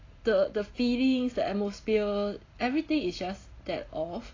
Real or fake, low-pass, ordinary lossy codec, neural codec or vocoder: real; 7.2 kHz; AAC, 32 kbps; none